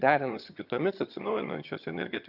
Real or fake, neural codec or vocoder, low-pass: fake; vocoder, 22.05 kHz, 80 mel bands, HiFi-GAN; 5.4 kHz